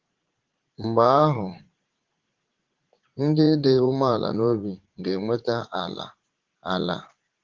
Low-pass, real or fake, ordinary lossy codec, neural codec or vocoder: 7.2 kHz; fake; Opus, 16 kbps; vocoder, 44.1 kHz, 80 mel bands, Vocos